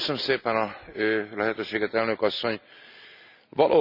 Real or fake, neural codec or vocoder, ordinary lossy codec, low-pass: real; none; none; 5.4 kHz